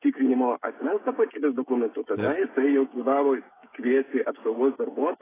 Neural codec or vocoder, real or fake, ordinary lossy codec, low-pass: codec, 16 kHz, 16 kbps, FreqCodec, smaller model; fake; AAC, 16 kbps; 3.6 kHz